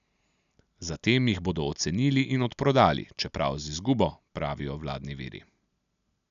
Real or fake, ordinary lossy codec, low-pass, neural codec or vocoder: real; none; 7.2 kHz; none